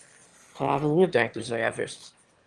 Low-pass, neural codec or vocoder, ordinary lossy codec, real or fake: 9.9 kHz; autoencoder, 22.05 kHz, a latent of 192 numbers a frame, VITS, trained on one speaker; Opus, 24 kbps; fake